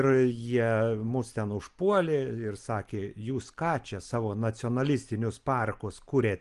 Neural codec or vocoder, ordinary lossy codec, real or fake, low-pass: none; Opus, 24 kbps; real; 10.8 kHz